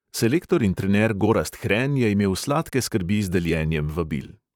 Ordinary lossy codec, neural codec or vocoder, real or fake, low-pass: none; vocoder, 44.1 kHz, 128 mel bands, Pupu-Vocoder; fake; 19.8 kHz